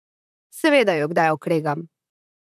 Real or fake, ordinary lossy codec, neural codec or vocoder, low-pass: fake; none; vocoder, 44.1 kHz, 128 mel bands, Pupu-Vocoder; 14.4 kHz